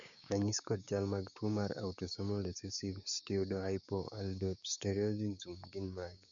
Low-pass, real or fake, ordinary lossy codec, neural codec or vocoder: 7.2 kHz; fake; none; codec, 16 kHz, 6 kbps, DAC